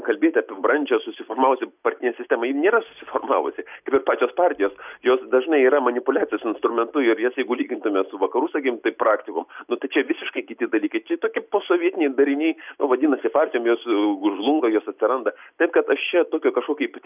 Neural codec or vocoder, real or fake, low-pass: none; real; 3.6 kHz